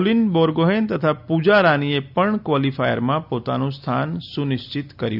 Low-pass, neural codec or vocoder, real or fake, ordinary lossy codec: 5.4 kHz; none; real; none